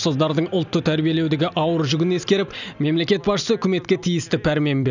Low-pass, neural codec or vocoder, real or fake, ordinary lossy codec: 7.2 kHz; none; real; none